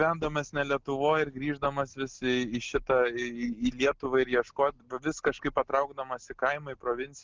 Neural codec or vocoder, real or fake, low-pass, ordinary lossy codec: none; real; 7.2 kHz; Opus, 24 kbps